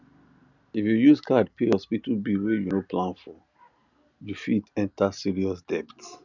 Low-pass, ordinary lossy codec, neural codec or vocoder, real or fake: 7.2 kHz; none; none; real